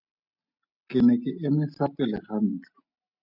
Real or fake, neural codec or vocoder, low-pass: real; none; 5.4 kHz